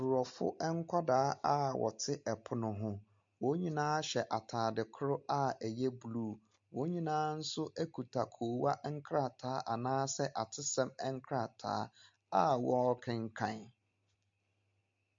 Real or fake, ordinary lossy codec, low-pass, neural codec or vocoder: real; MP3, 48 kbps; 7.2 kHz; none